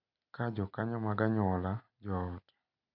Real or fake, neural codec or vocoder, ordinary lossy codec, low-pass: real; none; none; 5.4 kHz